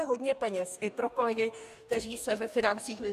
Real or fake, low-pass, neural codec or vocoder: fake; 14.4 kHz; codec, 44.1 kHz, 2.6 kbps, DAC